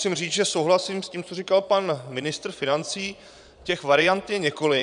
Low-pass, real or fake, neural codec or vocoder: 9.9 kHz; fake; vocoder, 22.05 kHz, 80 mel bands, Vocos